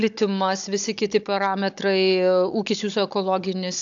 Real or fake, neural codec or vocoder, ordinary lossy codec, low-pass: fake; codec, 16 kHz, 16 kbps, FunCodec, trained on Chinese and English, 50 frames a second; AAC, 64 kbps; 7.2 kHz